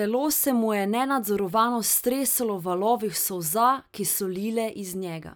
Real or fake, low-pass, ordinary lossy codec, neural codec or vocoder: real; none; none; none